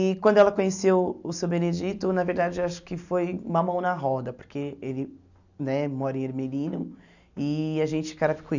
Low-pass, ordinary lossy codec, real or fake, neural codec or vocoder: 7.2 kHz; none; real; none